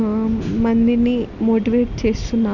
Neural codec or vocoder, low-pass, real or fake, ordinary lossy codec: none; 7.2 kHz; real; none